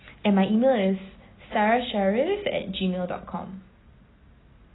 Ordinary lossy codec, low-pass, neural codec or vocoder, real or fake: AAC, 16 kbps; 7.2 kHz; none; real